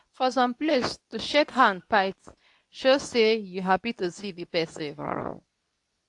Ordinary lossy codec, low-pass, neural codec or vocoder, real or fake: AAC, 64 kbps; 10.8 kHz; codec, 24 kHz, 0.9 kbps, WavTokenizer, medium speech release version 2; fake